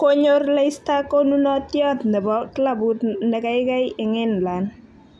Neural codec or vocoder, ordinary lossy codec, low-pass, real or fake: none; none; none; real